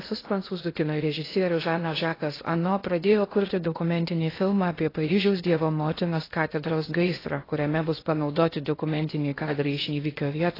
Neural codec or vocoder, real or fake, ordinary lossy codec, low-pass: codec, 16 kHz in and 24 kHz out, 0.6 kbps, FocalCodec, streaming, 2048 codes; fake; AAC, 24 kbps; 5.4 kHz